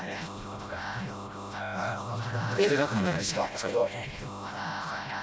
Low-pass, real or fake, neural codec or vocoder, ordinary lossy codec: none; fake; codec, 16 kHz, 0.5 kbps, FreqCodec, smaller model; none